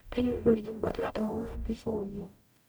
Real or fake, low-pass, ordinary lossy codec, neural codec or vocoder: fake; none; none; codec, 44.1 kHz, 0.9 kbps, DAC